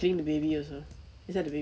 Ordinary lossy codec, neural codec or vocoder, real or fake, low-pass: none; none; real; none